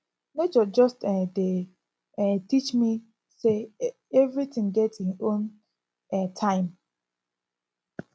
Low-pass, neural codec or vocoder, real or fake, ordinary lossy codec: none; none; real; none